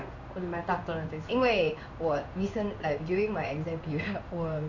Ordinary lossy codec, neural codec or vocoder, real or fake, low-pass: none; codec, 16 kHz in and 24 kHz out, 1 kbps, XY-Tokenizer; fake; 7.2 kHz